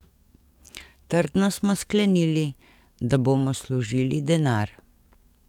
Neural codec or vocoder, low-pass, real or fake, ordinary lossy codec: codec, 44.1 kHz, 7.8 kbps, DAC; 19.8 kHz; fake; none